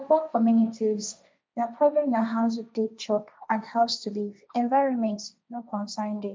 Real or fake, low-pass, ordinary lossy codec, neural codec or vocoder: fake; none; none; codec, 16 kHz, 1.1 kbps, Voila-Tokenizer